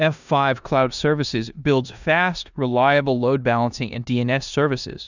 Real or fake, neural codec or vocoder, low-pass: fake; codec, 16 kHz in and 24 kHz out, 0.9 kbps, LongCat-Audio-Codec, four codebook decoder; 7.2 kHz